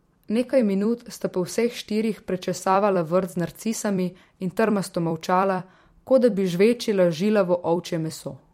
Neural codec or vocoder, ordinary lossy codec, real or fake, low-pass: vocoder, 44.1 kHz, 128 mel bands every 256 samples, BigVGAN v2; MP3, 64 kbps; fake; 19.8 kHz